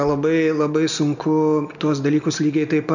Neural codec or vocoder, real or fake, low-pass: none; real; 7.2 kHz